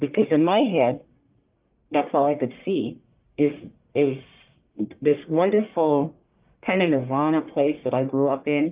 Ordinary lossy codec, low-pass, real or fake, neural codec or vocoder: Opus, 32 kbps; 3.6 kHz; fake; codec, 44.1 kHz, 1.7 kbps, Pupu-Codec